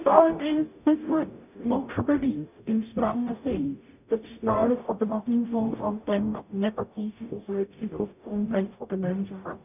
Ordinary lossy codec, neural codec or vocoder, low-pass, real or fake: none; codec, 44.1 kHz, 0.9 kbps, DAC; 3.6 kHz; fake